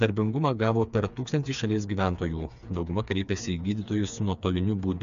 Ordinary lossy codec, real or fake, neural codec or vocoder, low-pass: AAC, 96 kbps; fake; codec, 16 kHz, 4 kbps, FreqCodec, smaller model; 7.2 kHz